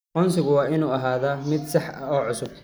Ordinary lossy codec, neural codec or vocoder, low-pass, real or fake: none; none; none; real